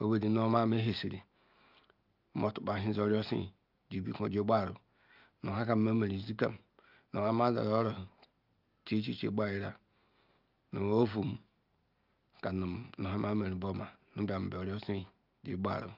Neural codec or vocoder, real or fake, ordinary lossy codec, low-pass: none; real; Opus, 24 kbps; 5.4 kHz